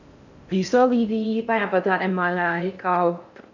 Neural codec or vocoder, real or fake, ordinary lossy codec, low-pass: codec, 16 kHz in and 24 kHz out, 0.6 kbps, FocalCodec, streaming, 4096 codes; fake; none; 7.2 kHz